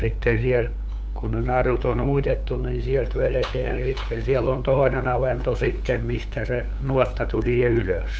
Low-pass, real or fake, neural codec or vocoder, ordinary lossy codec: none; fake; codec, 16 kHz, 8 kbps, FunCodec, trained on LibriTTS, 25 frames a second; none